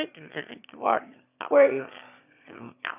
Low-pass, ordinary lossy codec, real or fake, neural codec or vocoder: 3.6 kHz; none; fake; autoencoder, 22.05 kHz, a latent of 192 numbers a frame, VITS, trained on one speaker